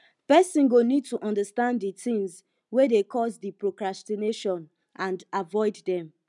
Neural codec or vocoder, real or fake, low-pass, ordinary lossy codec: none; real; 10.8 kHz; none